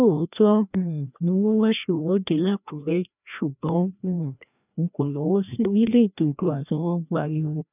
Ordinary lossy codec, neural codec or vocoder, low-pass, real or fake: none; codec, 16 kHz, 1 kbps, FreqCodec, larger model; 3.6 kHz; fake